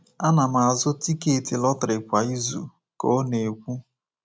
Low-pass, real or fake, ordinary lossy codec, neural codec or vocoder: none; real; none; none